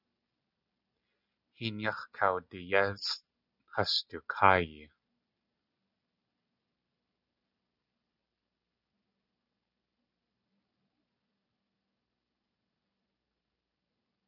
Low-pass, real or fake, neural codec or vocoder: 5.4 kHz; real; none